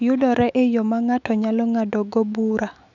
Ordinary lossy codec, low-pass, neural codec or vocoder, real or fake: none; 7.2 kHz; autoencoder, 48 kHz, 128 numbers a frame, DAC-VAE, trained on Japanese speech; fake